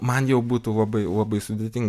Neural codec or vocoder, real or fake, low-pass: none; real; 14.4 kHz